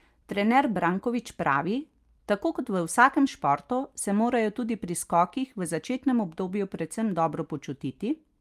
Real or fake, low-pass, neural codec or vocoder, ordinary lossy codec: real; 14.4 kHz; none; Opus, 32 kbps